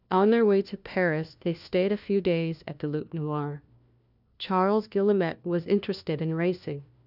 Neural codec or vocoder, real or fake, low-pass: codec, 16 kHz, 1 kbps, FunCodec, trained on LibriTTS, 50 frames a second; fake; 5.4 kHz